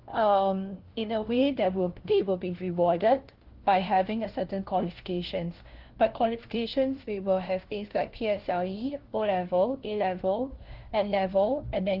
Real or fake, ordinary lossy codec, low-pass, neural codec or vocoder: fake; Opus, 16 kbps; 5.4 kHz; codec, 16 kHz, 1 kbps, FunCodec, trained on LibriTTS, 50 frames a second